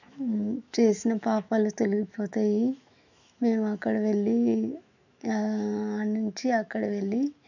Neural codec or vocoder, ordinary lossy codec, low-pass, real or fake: autoencoder, 48 kHz, 128 numbers a frame, DAC-VAE, trained on Japanese speech; none; 7.2 kHz; fake